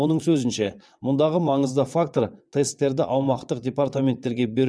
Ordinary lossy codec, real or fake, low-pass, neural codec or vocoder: none; fake; none; vocoder, 22.05 kHz, 80 mel bands, WaveNeXt